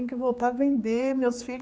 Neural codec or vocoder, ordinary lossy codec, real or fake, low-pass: codec, 16 kHz, 4 kbps, X-Codec, HuBERT features, trained on general audio; none; fake; none